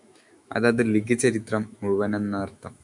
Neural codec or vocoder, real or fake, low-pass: autoencoder, 48 kHz, 128 numbers a frame, DAC-VAE, trained on Japanese speech; fake; 10.8 kHz